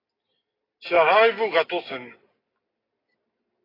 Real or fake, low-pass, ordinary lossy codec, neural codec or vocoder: real; 5.4 kHz; AAC, 24 kbps; none